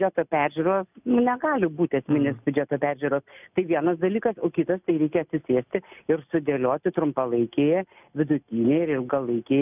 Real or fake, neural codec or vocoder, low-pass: real; none; 3.6 kHz